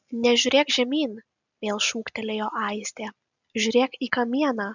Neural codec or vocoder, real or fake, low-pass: none; real; 7.2 kHz